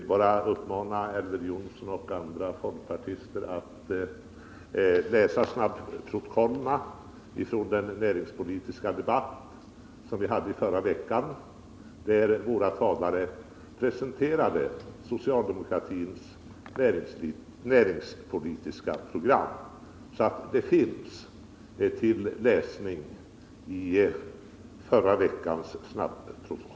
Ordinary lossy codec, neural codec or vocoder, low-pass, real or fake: none; none; none; real